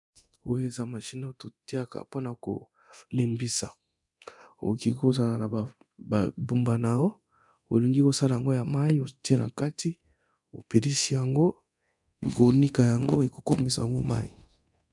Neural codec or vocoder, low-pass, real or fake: codec, 24 kHz, 0.9 kbps, DualCodec; 10.8 kHz; fake